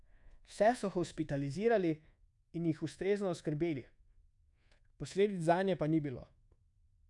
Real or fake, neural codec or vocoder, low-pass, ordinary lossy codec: fake; codec, 24 kHz, 1.2 kbps, DualCodec; 10.8 kHz; none